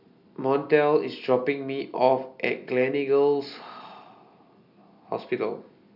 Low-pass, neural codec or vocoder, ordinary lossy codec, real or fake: 5.4 kHz; none; none; real